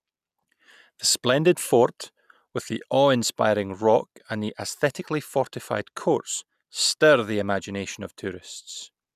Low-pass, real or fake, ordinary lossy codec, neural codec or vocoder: 14.4 kHz; real; none; none